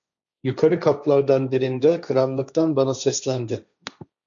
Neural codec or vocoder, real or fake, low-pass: codec, 16 kHz, 1.1 kbps, Voila-Tokenizer; fake; 7.2 kHz